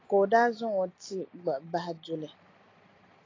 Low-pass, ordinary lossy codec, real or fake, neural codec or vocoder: 7.2 kHz; AAC, 32 kbps; fake; vocoder, 44.1 kHz, 128 mel bands every 256 samples, BigVGAN v2